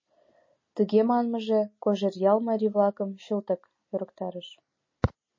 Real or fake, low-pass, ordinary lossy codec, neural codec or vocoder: real; 7.2 kHz; MP3, 32 kbps; none